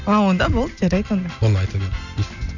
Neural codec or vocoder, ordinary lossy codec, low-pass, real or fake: none; none; 7.2 kHz; real